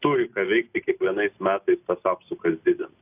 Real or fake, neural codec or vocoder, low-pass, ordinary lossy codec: real; none; 3.6 kHz; AAC, 32 kbps